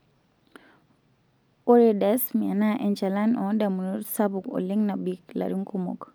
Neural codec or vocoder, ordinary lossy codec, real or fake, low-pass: none; none; real; none